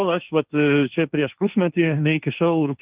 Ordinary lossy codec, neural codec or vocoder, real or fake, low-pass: Opus, 32 kbps; codec, 16 kHz, 1.1 kbps, Voila-Tokenizer; fake; 3.6 kHz